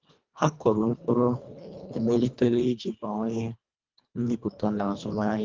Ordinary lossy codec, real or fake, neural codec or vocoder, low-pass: Opus, 32 kbps; fake; codec, 24 kHz, 1.5 kbps, HILCodec; 7.2 kHz